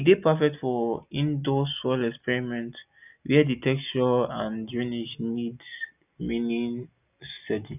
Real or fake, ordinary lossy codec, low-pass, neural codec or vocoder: real; none; 3.6 kHz; none